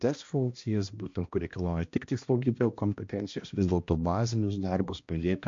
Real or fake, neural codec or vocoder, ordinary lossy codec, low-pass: fake; codec, 16 kHz, 1 kbps, X-Codec, HuBERT features, trained on balanced general audio; MP3, 48 kbps; 7.2 kHz